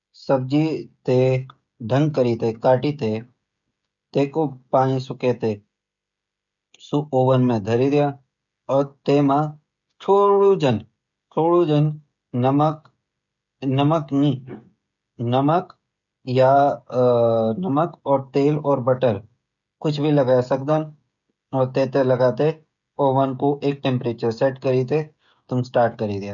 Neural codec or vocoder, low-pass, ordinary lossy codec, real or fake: codec, 16 kHz, 16 kbps, FreqCodec, smaller model; 7.2 kHz; none; fake